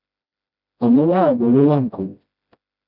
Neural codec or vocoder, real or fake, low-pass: codec, 16 kHz, 0.5 kbps, FreqCodec, smaller model; fake; 5.4 kHz